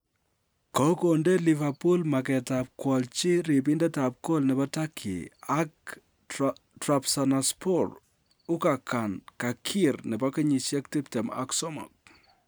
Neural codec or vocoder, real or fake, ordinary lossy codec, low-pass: none; real; none; none